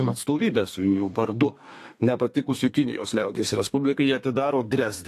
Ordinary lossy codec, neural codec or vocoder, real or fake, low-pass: AAC, 64 kbps; codec, 32 kHz, 1.9 kbps, SNAC; fake; 14.4 kHz